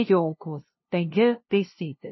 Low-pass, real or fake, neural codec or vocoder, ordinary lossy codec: 7.2 kHz; fake; codec, 16 kHz, 0.5 kbps, FunCodec, trained on LibriTTS, 25 frames a second; MP3, 24 kbps